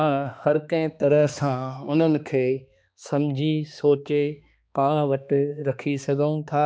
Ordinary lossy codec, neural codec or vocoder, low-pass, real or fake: none; codec, 16 kHz, 2 kbps, X-Codec, HuBERT features, trained on balanced general audio; none; fake